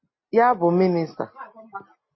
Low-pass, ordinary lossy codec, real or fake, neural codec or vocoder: 7.2 kHz; MP3, 24 kbps; real; none